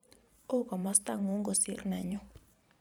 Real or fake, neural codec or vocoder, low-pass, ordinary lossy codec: fake; vocoder, 44.1 kHz, 128 mel bands every 512 samples, BigVGAN v2; none; none